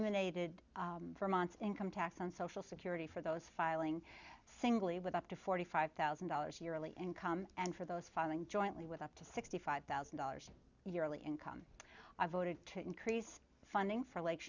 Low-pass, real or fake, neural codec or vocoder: 7.2 kHz; real; none